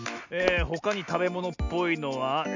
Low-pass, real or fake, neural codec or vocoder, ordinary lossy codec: 7.2 kHz; real; none; none